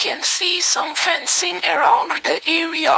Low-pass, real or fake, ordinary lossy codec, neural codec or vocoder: none; fake; none; codec, 16 kHz, 2 kbps, FunCodec, trained on LibriTTS, 25 frames a second